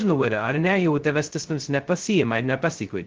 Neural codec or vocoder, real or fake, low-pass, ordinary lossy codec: codec, 16 kHz, 0.2 kbps, FocalCodec; fake; 7.2 kHz; Opus, 16 kbps